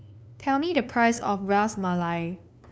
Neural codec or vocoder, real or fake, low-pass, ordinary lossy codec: codec, 16 kHz, 2 kbps, FunCodec, trained on LibriTTS, 25 frames a second; fake; none; none